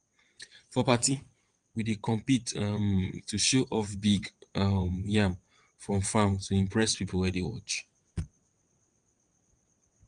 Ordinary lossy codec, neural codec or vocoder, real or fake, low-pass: Opus, 32 kbps; vocoder, 22.05 kHz, 80 mel bands, WaveNeXt; fake; 9.9 kHz